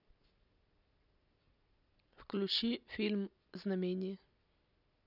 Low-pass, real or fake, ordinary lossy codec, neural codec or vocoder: 5.4 kHz; real; none; none